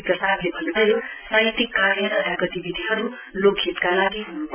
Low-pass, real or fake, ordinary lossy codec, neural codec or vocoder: 3.6 kHz; real; none; none